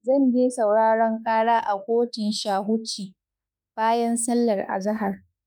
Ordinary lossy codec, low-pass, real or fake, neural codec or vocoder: none; none; fake; autoencoder, 48 kHz, 32 numbers a frame, DAC-VAE, trained on Japanese speech